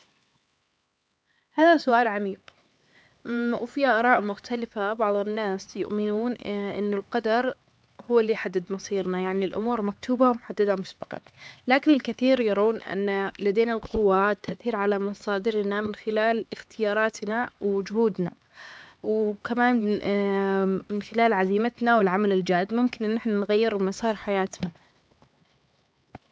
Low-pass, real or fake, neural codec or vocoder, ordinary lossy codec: none; fake; codec, 16 kHz, 4 kbps, X-Codec, HuBERT features, trained on LibriSpeech; none